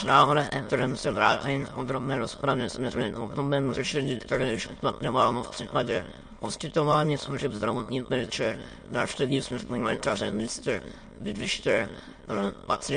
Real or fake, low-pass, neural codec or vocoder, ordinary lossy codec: fake; 9.9 kHz; autoencoder, 22.05 kHz, a latent of 192 numbers a frame, VITS, trained on many speakers; MP3, 48 kbps